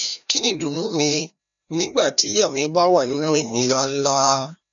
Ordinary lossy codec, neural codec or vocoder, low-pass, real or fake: none; codec, 16 kHz, 1 kbps, FreqCodec, larger model; 7.2 kHz; fake